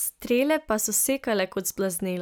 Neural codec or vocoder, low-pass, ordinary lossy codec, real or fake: none; none; none; real